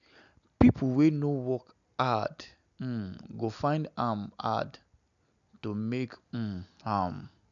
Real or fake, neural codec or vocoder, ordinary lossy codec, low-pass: real; none; none; 7.2 kHz